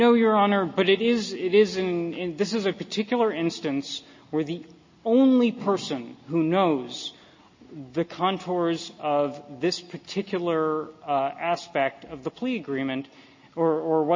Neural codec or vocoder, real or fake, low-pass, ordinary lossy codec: none; real; 7.2 kHz; MP3, 32 kbps